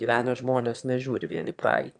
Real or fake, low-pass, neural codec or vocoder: fake; 9.9 kHz; autoencoder, 22.05 kHz, a latent of 192 numbers a frame, VITS, trained on one speaker